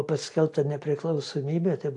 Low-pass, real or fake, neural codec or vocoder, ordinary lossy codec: 10.8 kHz; real; none; AAC, 48 kbps